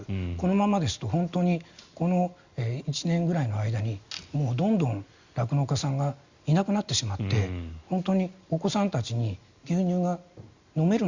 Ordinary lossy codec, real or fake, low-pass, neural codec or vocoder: Opus, 64 kbps; real; 7.2 kHz; none